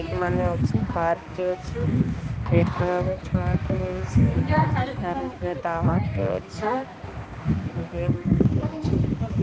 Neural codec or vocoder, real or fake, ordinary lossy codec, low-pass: codec, 16 kHz, 2 kbps, X-Codec, HuBERT features, trained on balanced general audio; fake; none; none